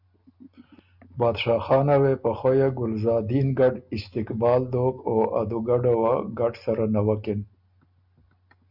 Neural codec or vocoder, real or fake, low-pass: none; real; 5.4 kHz